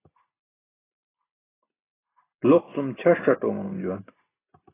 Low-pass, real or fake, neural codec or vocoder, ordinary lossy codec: 3.6 kHz; real; none; AAC, 16 kbps